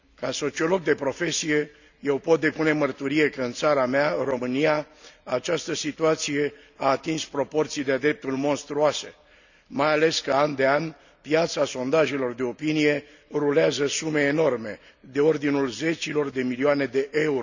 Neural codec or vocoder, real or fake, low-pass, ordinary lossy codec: none; real; 7.2 kHz; none